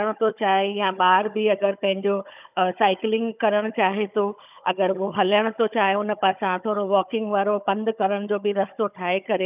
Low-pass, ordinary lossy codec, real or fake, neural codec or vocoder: 3.6 kHz; none; fake; codec, 16 kHz, 16 kbps, FunCodec, trained on Chinese and English, 50 frames a second